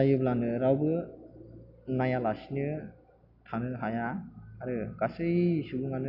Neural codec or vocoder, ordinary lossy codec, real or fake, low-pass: none; AAC, 48 kbps; real; 5.4 kHz